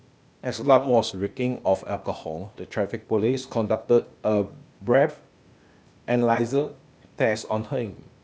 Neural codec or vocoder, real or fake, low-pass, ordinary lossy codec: codec, 16 kHz, 0.8 kbps, ZipCodec; fake; none; none